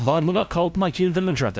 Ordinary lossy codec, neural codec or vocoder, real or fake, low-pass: none; codec, 16 kHz, 0.5 kbps, FunCodec, trained on LibriTTS, 25 frames a second; fake; none